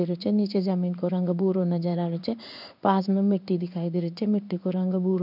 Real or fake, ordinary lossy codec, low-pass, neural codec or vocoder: fake; none; 5.4 kHz; codec, 16 kHz in and 24 kHz out, 1 kbps, XY-Tokenizer